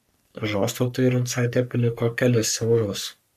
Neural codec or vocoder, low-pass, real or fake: codec, 44.1 kHz, 3.4 kbps, Pupu-Codec; 14.4 kHz; fake